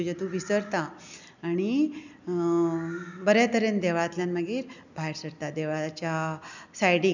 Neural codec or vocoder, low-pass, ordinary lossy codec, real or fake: none; 7.2 kHz; none; real